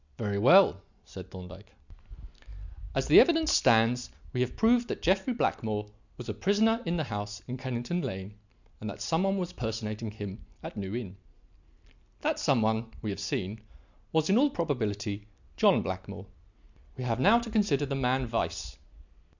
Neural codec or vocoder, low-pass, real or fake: none; 7.2 kHz; real